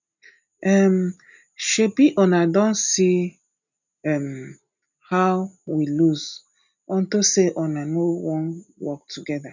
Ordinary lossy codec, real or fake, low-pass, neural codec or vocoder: none; real; 7.2 kHz; none